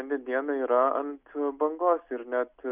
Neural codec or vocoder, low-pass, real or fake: none; 3.6 kHz; real